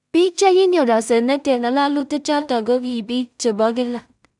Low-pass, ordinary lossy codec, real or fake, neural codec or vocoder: 10.8 kHz; none; fake; codec, 16 kHz in and 24 kHz out, 0.4 kbps, LongCat-Audio-Codec, two codebook decoder